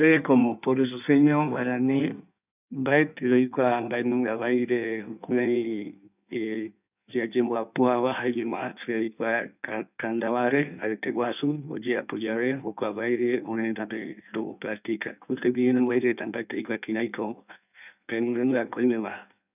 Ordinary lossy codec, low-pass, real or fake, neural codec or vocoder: none; 3.6 kHz; fake; codec, 16 kHz in and 24 kHz out, 1.1 kbps, FireRedTTS-2 codec